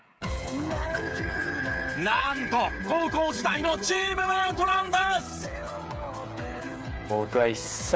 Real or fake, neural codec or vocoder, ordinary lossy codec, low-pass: fake; codec, 16 kHz, 8 kbps, FreqCodec, smaller model; none; none